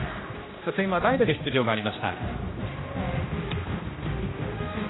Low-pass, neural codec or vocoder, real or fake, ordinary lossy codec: 7.2 kHz; codec, 16 kHz, 1 kbps, X-Codec, HuBERT features, trained on general audio; fake; AAC, 16 kbps